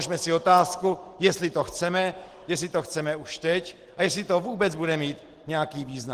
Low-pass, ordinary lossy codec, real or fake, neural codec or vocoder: 14.4 kHz; Opus, 16 kbps; real; none